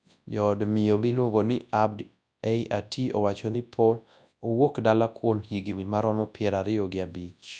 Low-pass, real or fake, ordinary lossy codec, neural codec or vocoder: 9.9 kHz; fake; none; codec, 24 kHz, 0.9 kbps, WavTokenizer, large speech release